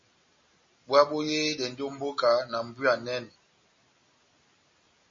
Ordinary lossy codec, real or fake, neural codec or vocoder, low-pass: MP3, 32 kbps; real; none; 7.2 kHz